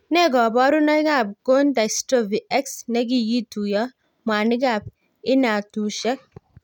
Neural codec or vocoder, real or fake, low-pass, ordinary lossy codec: none; real; 19.8 kHz; none